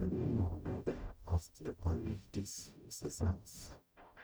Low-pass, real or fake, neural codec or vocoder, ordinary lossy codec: none; fake; codec, 44.1 kHz, 0.9 kbps, DAC; none